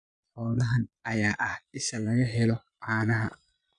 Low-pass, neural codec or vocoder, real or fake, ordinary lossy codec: 9.9 kHz; vocoder, 22.05 kHz, 80 mel bands, Vocos; fake; none